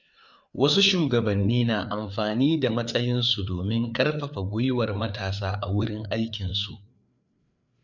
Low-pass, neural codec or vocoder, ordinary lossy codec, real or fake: 7.2 kHz; codec, 16 kHz, 4 kbps, FreqCodec, larger model; none; fake